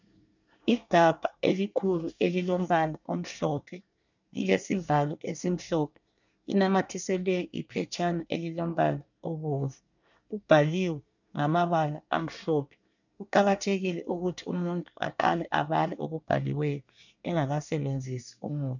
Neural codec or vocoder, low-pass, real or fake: codec, 24 kHz, 1 kbps, SNAC; 7.2 kHz; fake